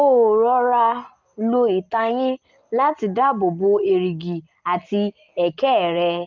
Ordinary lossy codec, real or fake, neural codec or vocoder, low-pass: Opus, 32 kbps; real; none; 7.2 kHz